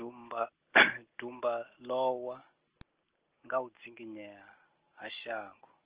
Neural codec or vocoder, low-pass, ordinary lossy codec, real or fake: none; 3.6 kHz; Opus, 16 kbps; real